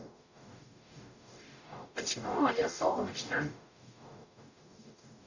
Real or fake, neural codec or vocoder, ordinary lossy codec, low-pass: fake; codec, 44.1 kHz, 0.9 kbps, DAC; none; 7.2 kHz